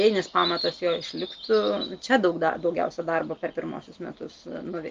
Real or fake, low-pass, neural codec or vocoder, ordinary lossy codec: real; 7.2 kHz; none; Opus, 32 kbps